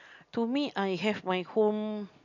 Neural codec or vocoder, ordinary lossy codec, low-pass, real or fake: none; none; 7.2 kHz; real